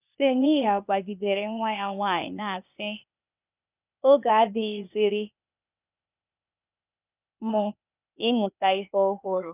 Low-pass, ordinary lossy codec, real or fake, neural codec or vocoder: 3.6 kHz; none; fake; codec, 16 kHz, 0.8 kbps, ZipCodec